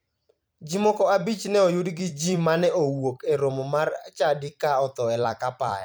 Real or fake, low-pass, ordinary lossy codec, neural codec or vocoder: fake; none; none; vocoder, 44.1 kHz, 128 mel bands every 256 samples, BigVGAN v2